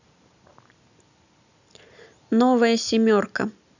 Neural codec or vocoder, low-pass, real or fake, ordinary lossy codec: none; 7.2 kHz; real; none